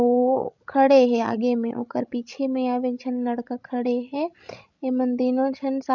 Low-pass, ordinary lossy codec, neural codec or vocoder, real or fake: 7.2 kHz; none; codec, 16 kHz, 16 kbps, FreqCodec, larger model; fake